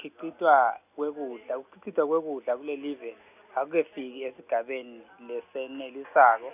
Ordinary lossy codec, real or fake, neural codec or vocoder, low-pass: none; real; none; 3.6 kHz